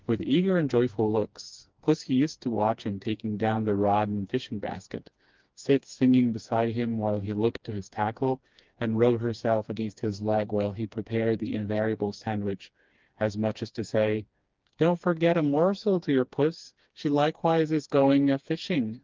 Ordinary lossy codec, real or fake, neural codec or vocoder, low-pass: Opus, 32 kbps; fake; codec, 16 kHz, 2 kbps, FreqCodec, smaller model; 7.2 kHz